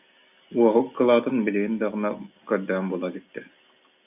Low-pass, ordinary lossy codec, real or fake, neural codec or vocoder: 3.6 kHz; AAC, 32 kbps; real; none